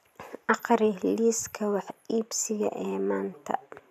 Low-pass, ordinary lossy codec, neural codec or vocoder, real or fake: 14.4 kHz; none; vocoder, 44.1 kHz, 128 mel bands every 512 samples, BigVGAN v2; fake